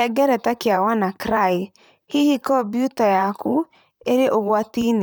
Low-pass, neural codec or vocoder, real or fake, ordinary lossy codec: none; vocoder, 44.1 kHz, 128 mel bands, Pupu-Vocoder; fake; none